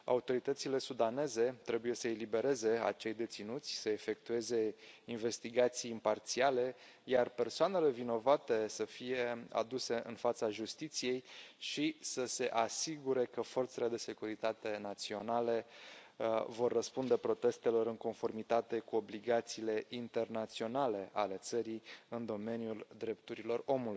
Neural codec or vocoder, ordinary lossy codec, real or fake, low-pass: none; none; real; none